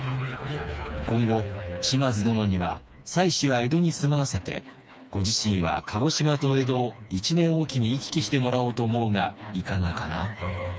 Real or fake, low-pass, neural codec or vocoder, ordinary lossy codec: fake; none; codec, 16 kHz, 2 kbps, FreqCodec, smaller model; none